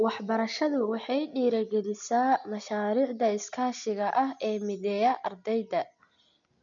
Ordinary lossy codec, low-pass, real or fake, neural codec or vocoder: none; 7.2 kHz; real; none